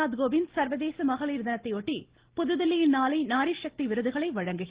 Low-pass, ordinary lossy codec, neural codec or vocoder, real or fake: 3.6 kHz; Opus, 16 kbps; none; real